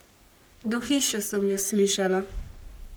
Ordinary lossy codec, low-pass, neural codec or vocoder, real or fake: none; none; codec, 44.1 kHz, 3.4 kbps, Pupu-Codec; fake